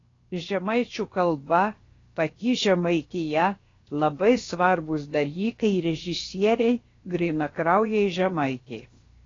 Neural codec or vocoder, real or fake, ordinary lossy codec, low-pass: codec, 16 kHz, 0.7 kbps, FocalCodec; fake; AAC, 32 kbps; 7.2 kHz